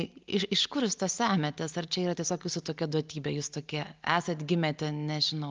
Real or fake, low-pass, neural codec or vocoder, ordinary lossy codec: real; 7.2 kHz; none; Opus, 32 kbps